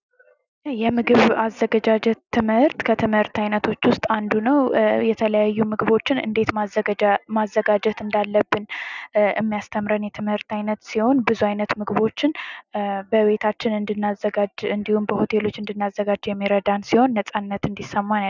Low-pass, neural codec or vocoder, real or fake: 7.2 kHz; none; real